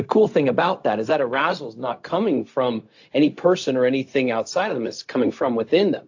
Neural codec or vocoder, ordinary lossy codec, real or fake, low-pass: codec, 16 kHz, 0.4 kbps, LongCat-Audio-Codec; AAC, 48 kbps; fake; 7.2 kHz